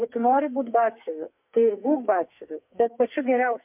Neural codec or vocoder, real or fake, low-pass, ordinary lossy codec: codec, 44.1 kHz, 2.6 kbps, SNAC; fake; 3.6 kHz; MP3, 32 kbps